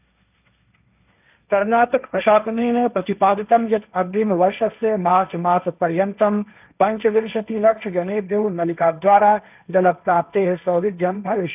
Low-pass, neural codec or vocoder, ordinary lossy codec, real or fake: 3.6 kHz; codec, 16 kHz, 1.1 kbps, Voila-Tokenizer; none; fake